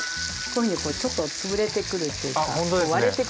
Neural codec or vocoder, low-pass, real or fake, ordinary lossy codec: none; none; real; none